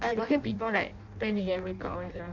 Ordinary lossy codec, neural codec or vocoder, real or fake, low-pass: none; codec, 16 kHz in and 24 kHz out, 0.6 kbps, FireRedTTS-2 codec; fake; 7.2 kHz